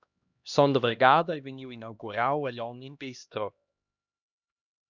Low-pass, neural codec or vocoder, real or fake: 7.2 kHz; codec, 16 kHz, 1 kbps, X-Codec, HuBERT features, trained on LibriSpeech; fake